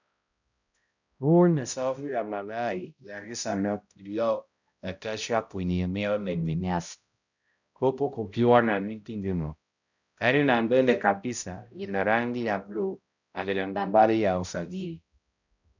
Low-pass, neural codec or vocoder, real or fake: 7.2 kHz; codec, 16 kHz, 0.5 kbps, X-Codec, HuBERT features, trained on balanced general audio; fake